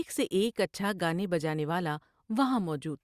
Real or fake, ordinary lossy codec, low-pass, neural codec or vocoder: real; Opus, 64 kbps; 14.4 kHz; none